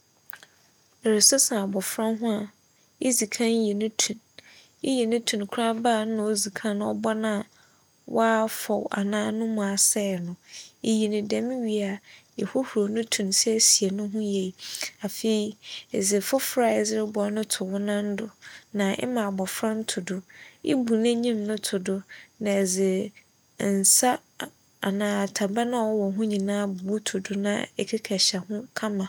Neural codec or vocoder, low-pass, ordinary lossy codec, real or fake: none; 19.8 kHz; none; real